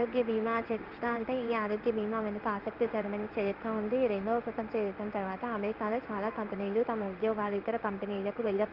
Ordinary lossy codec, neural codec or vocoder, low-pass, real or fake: Opus, 32 kbps; codec, 16 kHz in and 24 kHz out, 1 kbps, XY-Tokenizer; 5.4 kHz; fake